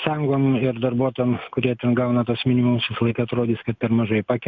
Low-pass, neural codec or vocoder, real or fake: 7.2 kHz; none; real